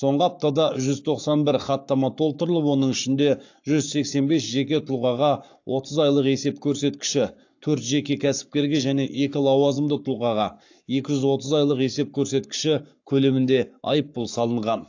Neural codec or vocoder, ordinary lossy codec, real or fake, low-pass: codec, 44.1 kHz, 7.8 kbps, Pupu-Codec; AAC, 48 kbps; fake; 7.2 kHz